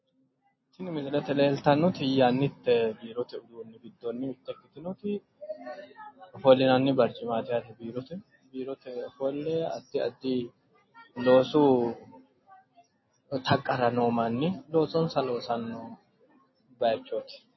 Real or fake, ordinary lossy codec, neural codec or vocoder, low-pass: real; MP3, 24 kbps; none; 7.2 kHz